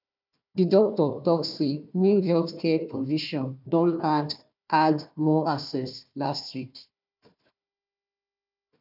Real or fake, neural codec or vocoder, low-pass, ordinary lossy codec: fake; codec, 16 kHz, 1 kbps, FunCodec, trained on Chinese and English, 50 frames a second; 5.4 kHz; none